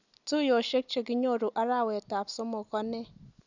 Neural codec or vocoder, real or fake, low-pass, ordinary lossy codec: none; real; 7.2 kHz; none